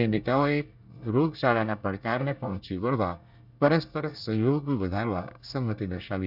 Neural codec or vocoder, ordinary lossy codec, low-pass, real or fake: codec, 24 kHz, 1 kbps, SNAC; none; 5.4 kHz; fake